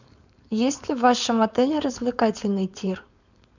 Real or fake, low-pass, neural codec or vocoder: fake; 7.2 kHz; codec, 16 kHz, 4.8 kbps, FACodec